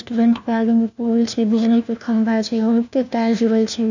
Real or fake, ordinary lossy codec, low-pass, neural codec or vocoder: fake; none; 7.2 kHz; codec, 16 kHz, 1 kbps, FunCodec, trained on LibriTTS, 50 frames a second